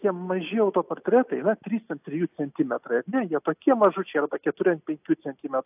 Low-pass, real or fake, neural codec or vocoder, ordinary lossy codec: 3.6 kHz; real; none; AAC, 32 kbps